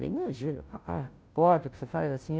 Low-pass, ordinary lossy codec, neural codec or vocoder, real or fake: none; none; codec, 16 kHz, 0.5 kbps, FunCodec, trained on Chinese and English, 25 frames a second; fake